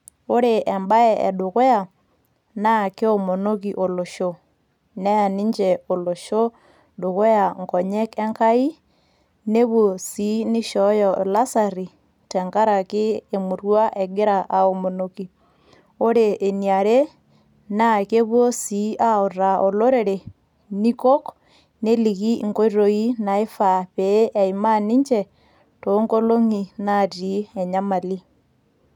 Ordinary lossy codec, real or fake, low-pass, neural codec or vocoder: none; real; 19.8 kHz; none